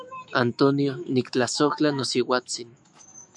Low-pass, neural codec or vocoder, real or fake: 10.8 kHz; autoencoder, 48 kHz, 128 numbers a frame, DAC-VAE, trained on Japanese speech; fake